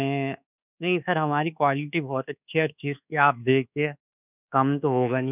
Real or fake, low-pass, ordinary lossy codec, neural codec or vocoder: fake; 3.6 kHz; none; codec, 24 kHz, 1.2 kbps, DualCodec